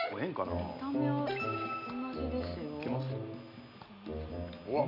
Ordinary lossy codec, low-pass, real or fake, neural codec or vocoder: none; 5.4 kHz; real; none